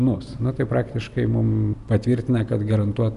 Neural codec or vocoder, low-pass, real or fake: none; 10.8 kHz; real